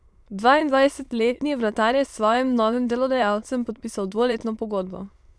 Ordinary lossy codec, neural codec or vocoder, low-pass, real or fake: none; autoencoder, 22.05 kHz, a latent of 192 numbers a frame, VITS, trained on many speakers; none; fake